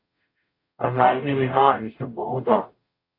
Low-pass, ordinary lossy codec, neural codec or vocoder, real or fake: 5.4 kHz; AAC, 32 kbps; codec, 44.1 kHz, 0.9 kbps, DAC; fake